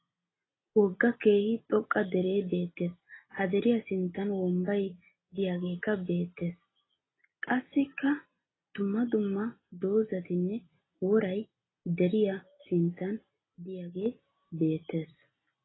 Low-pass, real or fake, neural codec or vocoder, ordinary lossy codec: 7.2 kHz; real; none; AAC, 16 kbps